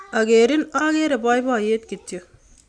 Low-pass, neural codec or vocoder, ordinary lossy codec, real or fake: 9.9 kHz; none; none; real